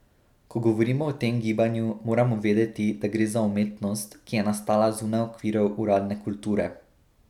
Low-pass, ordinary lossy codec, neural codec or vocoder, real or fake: 19.8 kHz; none; none; real